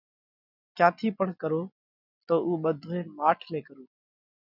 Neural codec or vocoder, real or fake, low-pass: none; real; 5.4 kHz